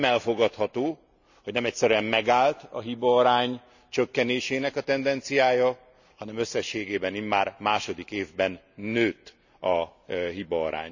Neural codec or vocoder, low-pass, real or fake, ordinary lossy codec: none; 7.2 kHz; real; none